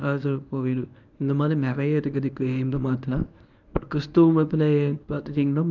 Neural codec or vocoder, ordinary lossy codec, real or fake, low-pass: codec, 24 kHz, 0.9 kbps, WavTokenizer, medium speech release version 1; none; fake; 7.2 kHz